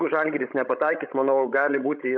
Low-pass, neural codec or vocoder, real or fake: 7.2 kHz; codec, 16 kHz, 16 kbps, FreqCodec, larger model; fake